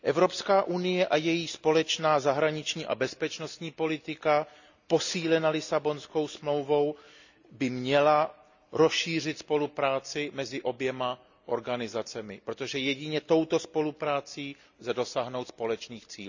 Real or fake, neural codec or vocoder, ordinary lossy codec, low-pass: real; none; none; 7.2 kHz